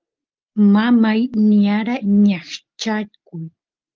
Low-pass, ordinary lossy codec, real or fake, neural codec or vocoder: 7.2 kHz; Opus, 32 kbps; real; none